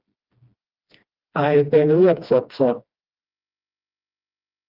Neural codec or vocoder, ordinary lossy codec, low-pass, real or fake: codec, 16 kHz, 1 kbps, FreqCodec, smaller model; Opus, 32 kbps; 5.4 kHz; fake